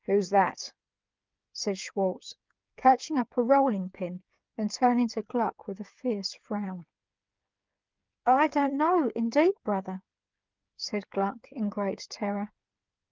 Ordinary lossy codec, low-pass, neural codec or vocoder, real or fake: Opus, 24 kbps; 7.2 kHz; codec, 16 kHz, 8 kbps, FreqCodec, smaller model; fake